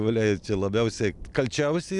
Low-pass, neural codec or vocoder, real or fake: 10.8 kHz; none; real